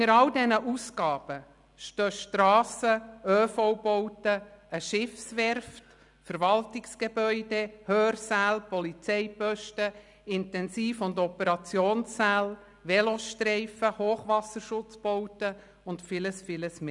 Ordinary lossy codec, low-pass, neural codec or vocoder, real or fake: none; 10.8 kHz; none; real